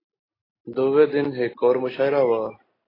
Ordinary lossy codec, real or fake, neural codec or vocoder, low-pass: AAC, 24 kbps; real; none; 5.4 kHz